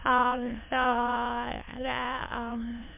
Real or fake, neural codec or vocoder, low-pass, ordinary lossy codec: fake; autoencoder, 22.05 kHz, a latent of 192 numbers a frame, VITS, trained on many speakers; 3.6 kHz; MP3, 32 kbps